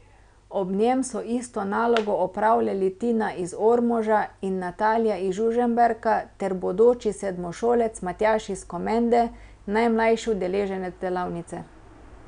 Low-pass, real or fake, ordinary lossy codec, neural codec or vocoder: 9.9 kHz; real; none; none